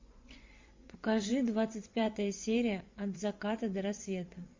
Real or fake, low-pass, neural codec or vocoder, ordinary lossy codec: fake; 7.2 kHz; vocoder, 24 kHz, 100 mel bands, Vocos; MP3, 48 kbps